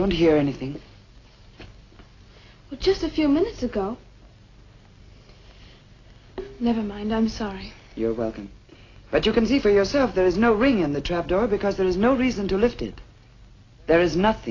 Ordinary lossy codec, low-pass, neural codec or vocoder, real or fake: AAC, 32 kbps; 7.2 kHz; none; real